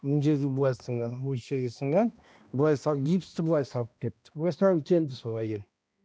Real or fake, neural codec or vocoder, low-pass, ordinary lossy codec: fake; codec, 16 kHz, 1 kbps, X-Codec, HuBERT features, trained on balanced general audio; none; none